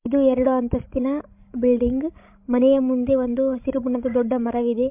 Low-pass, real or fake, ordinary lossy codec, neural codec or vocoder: 3.6 kHz; fake; MP3, 32 kbps; codec, 16 kHz, 16 kbps, FreqCodec, larger model